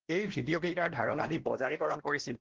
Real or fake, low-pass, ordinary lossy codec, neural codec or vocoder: fake; 7.2 kHz; Opus, 16 kbps; codec, 16 kHz, 1 kbps, X-Codec, WavLM features, trained on Multilingual LibriSpeech